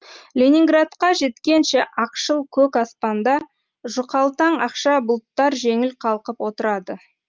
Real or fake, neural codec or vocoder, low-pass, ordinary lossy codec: real; none; 7.2 kHz; Opus, 24 kbps